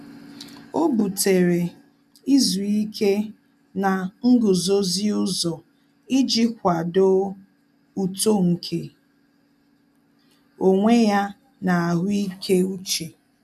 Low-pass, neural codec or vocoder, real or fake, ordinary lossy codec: 14.4 kHz; none; real; none